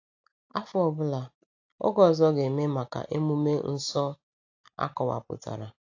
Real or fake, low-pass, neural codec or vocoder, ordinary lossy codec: real; 7.2 kHz; none; AAC, 48 kbps